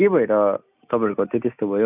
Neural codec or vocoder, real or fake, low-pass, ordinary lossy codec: none; real; 3.6 kHz; none